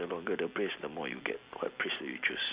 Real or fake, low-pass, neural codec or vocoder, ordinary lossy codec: real; 3.6 kHz; none; Opus, 64 kbps